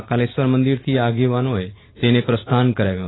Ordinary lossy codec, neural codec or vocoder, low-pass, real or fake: AAC, 16 kbps; none; 7.2 kHz; real